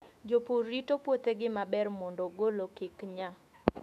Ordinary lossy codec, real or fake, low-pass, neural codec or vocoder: AAC, 96 kbps; fake; 14.4 kHz; autoencoder, 48 kHz, 128 numbers a frame, DAC-VAE, trained on Japanese speech